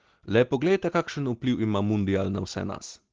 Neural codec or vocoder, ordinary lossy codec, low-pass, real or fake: none; Opus, 16 kbps; 7.2 kHz; real